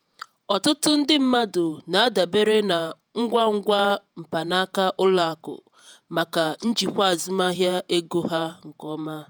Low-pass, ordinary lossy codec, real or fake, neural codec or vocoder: none; none; fake; vocoder, 48 kHz, 128 mel bands, Vocos